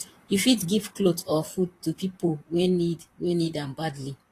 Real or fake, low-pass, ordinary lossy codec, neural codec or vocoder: fake; 14.4 kHz; AAC, 48 kbps; vocoder, 44.1 kHz, 128 mel bands, Pupu-Vocoder